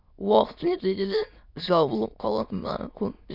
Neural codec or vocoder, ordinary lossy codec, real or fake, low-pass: autoencoder, 22.05 kHz, a latent of 192 numbers a frame, VITS, trained on many speakers; none; fake; 5.4 kHz